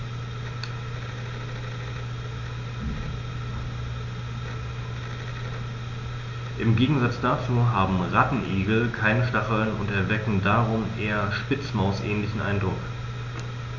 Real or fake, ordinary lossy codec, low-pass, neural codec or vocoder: real; none; 7.2 kHz; none